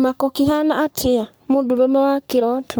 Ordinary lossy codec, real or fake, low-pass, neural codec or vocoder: none; fake; none; codec, 44.1 kHz, 3.4 kbps, Pupu-Codec